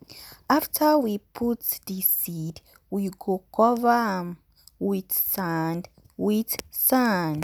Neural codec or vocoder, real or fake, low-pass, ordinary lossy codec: none; real; none; none